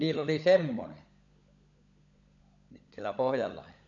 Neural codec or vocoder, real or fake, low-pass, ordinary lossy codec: codec, 16 kHz, 16 kbps, FunCodec, trained on LibriTTS, 50 frames a second; fake; 7.2 kHz; none